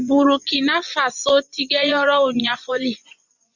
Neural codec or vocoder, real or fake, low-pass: vocoder, 24 kHz, 100 mel bands, Vocos; fake; 7.2 kHz